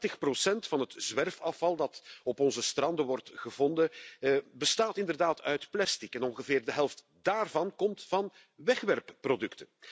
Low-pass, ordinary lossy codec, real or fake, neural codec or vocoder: none; none; real; none